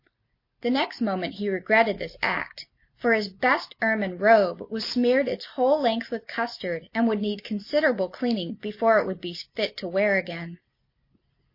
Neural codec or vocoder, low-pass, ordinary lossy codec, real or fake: none; 5.4 kHz; MP3, 32 kbps; real